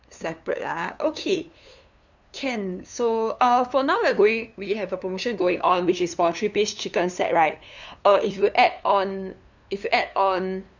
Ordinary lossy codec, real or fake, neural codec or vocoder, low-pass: none; fake; codec, 16 kHz, 2 kbps, FunCodec, trained on LibriTTS, 25 frames a second; 7.2 kHz